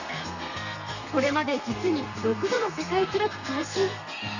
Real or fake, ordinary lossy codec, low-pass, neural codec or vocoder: fake; none; 7.2 kHz; codec, 32 kHz, 1.9 kbps, SNAC